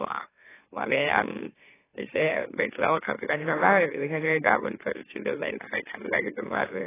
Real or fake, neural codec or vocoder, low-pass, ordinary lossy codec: fake; autoencoder, 44.1 kHz, a latent of 192 numbers a frame, MeloTTS; 3.6 kHz; AAC, 16 kbps